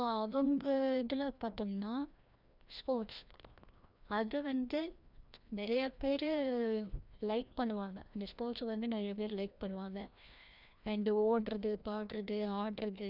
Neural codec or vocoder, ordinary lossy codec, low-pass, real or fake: codec, 16 kHz, 1 kbps, FreqCodec, larger model; none; 5.4 kHz; fake